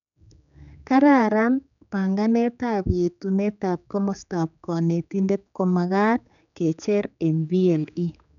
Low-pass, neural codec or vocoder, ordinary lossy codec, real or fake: 7.2 kHz; codec, 16 kHz, 4 kbps, X-Codec, HuBERT features, trained on general audio; none; fake